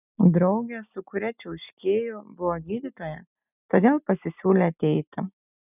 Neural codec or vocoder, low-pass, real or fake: none; 3.6 kHz; real